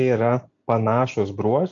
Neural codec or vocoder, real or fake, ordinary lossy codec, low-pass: none; real; AAC, 64 kbps; 7.2 kHz